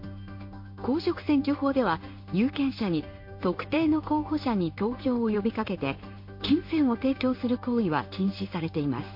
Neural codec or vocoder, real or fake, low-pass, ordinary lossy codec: codec, 16 kHz in and 24 kHz out, 1 kbps, XY-Tokenizer; fake; 5.4 kHz; MP3, 32 kbps